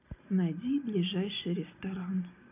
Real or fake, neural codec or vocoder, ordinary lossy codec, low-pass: real; none; none; 3.6 kHz